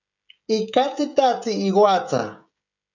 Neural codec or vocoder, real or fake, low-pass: codec, 16 kHz, 16 kbps, FreqCodec, smaller model; fake; 7.2 kHz